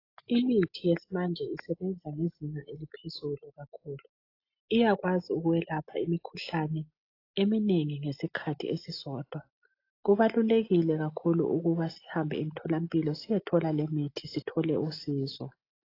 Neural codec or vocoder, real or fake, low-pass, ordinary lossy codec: none; real; 5.4 kHz; AAC, 32 kbps